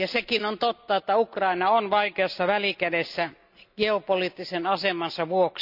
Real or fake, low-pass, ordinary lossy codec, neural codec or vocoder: real; 5.4 kHz; none; none